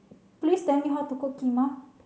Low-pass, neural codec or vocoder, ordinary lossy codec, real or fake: none; none; none; real